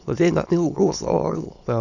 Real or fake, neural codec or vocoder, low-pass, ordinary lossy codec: fake; autoencoder, 22.05 kHz, a latent of 192 numbers a frame, VITS, trained on many speakers; 7.2 kHz; none